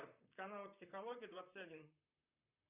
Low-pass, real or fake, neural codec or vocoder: 3.6 kHz; fake; vocoder, 44.1 kHz, 128 mel bands, Pupu-Vocoder